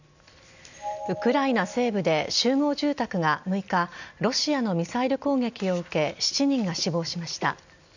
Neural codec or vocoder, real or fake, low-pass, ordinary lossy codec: none; real; 7.2 kHz; none